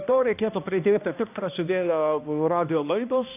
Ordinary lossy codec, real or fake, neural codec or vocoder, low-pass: AAC, 32 kbps; fake; codec, 16 kHz, 1 kbps, X-Codec, HuBERT features, trained on balanced general audio; 3.6 kHz